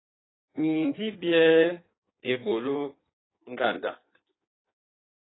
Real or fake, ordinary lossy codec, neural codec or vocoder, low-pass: fake; AAC, 16 kbps; codec, 16 kHz in and 24 kHz out, 1.1 kbps, FireRedTTS-2 codec; 7.2 kHz